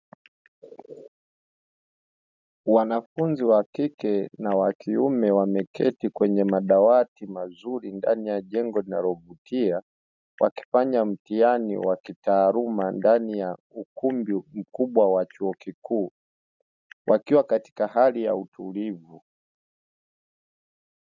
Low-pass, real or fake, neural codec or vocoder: 7.2 kHz; real; none